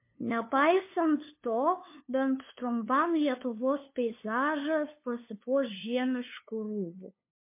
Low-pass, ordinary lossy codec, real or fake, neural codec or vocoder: 3.6 kHz; MP3, 24 kbps; fake; codec, 16 kHz, 2 kbps, FunCodec, trained on LibriTTS, 25 frames a second